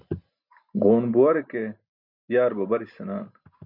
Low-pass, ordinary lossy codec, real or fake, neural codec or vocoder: 5.4 kHz; MP3, 48 kbps; real; none